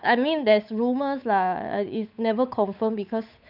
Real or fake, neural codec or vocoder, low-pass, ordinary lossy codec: fake; codec, 16 kHz, 8 kbps, FunCodec, trained on Chinese and English, 25 frames a second; 5.4 kHz; none